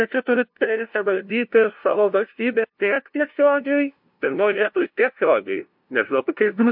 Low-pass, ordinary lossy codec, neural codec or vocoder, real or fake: 5.4 kHz; MP3, 48 kbps; codec, 16 kHz, 0.5 kbps, FunCodec, trained on LibriTTS, 25 frames a second; fake